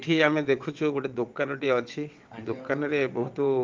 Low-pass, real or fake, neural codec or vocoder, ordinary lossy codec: 7.2 kHz; fake; vocoder, 22.05 kHz, 80 mel bands, WaveNeXt; Opus, 32 kbps